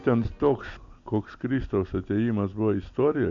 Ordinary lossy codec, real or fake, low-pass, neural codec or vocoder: AAC, 64 kbps; real; 7.2 kHz; none